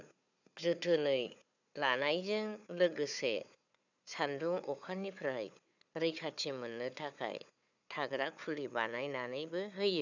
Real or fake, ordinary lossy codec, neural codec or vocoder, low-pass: fake; none; codec, 16 kHz, 4 kbps, FunCodec, trained on Chinese and English, 50 frames a second; 7.2 kHz